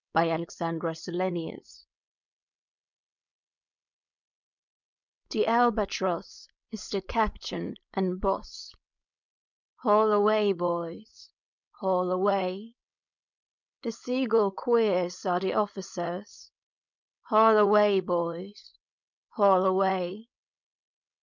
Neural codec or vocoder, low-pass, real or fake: codec, 16 kHz, 4.8 kbps, FACodec; 7.2 kHz; fake